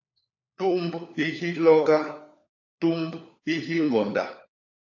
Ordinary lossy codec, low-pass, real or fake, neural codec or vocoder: AAC, 48 kbps; 7.2 kHz; fake; codec, 16 kHz, 4 kbps, FunCodec, trained on LibriTTS, 50 frames a second